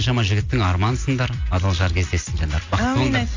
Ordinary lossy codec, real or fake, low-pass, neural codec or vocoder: AAC, 48 kbps; real; 7.2 kHz; none